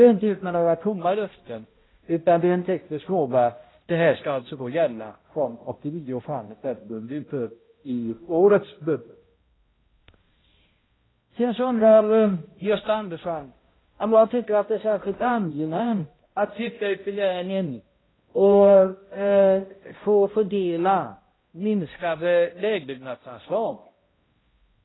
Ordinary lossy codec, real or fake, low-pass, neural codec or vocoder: AAC, 16 kbps; fake; 7.2 kHz; codec, 16 kHz, 0.5 kbps, X-Codec, HuBERT features, trained on balanced general audio